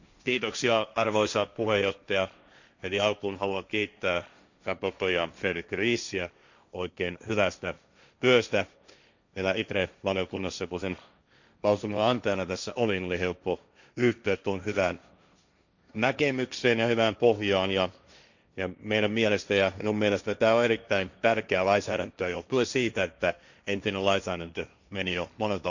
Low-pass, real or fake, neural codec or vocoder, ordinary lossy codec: 7.2 kHz; fake; codec, 16 kHz, 1.1 kbps, Voila-Tokenizer; none